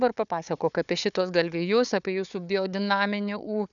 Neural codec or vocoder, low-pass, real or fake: codec, 16 kHz, 4 kbps, FunCodec, trained on Chinese and English, 50 frames a second; 7.2 kHz; fake